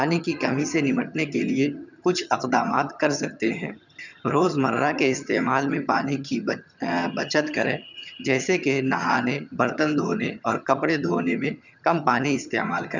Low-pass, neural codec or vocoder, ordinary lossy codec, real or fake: 7.2 kHz; vocoder, 22.05 kHz, 80 mel bands, HiFi-GAN; none; fake